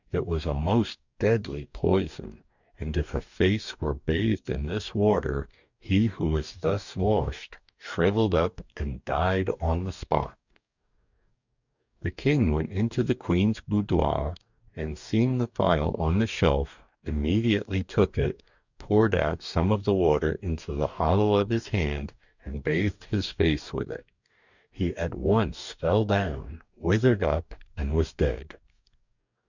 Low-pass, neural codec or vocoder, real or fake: 7.2 kHz; codec, 44.1 kHz, 2.6 kbps, DAC; fake